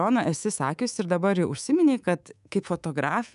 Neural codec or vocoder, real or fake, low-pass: codec, 24 kHz, 3.1 kbps, DualCodec; fake; 10.8 kHz